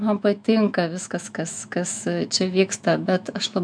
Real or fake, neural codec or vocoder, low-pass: fake; autoencoder, 48 kHz, 128 numbers a frame, DAC-VAE, trained on Japanese speech; 9.9 kHz